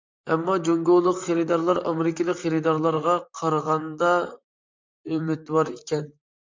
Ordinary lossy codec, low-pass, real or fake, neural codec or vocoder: MP3, 64 kbps; 7.2 kHz; fake; vocoder, 44.1 kHz, 128 mel bands, Pupu-Vocoder